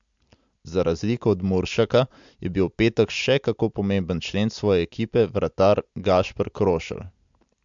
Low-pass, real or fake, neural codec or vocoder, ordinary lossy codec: 7.2 kHz; real; none; MP3, 64 kbps